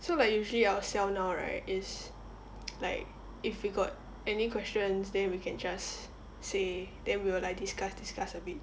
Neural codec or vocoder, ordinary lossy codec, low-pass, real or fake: none; none; none; real